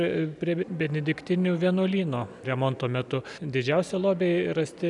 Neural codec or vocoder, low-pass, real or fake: none; 10.8 kHz; real